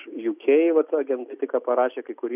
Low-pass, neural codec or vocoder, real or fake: 3.6 kHz; none; real